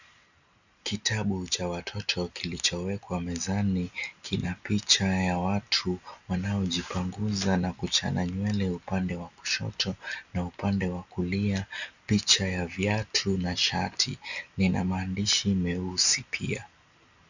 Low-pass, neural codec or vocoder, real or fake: 7.2 kHz; none; real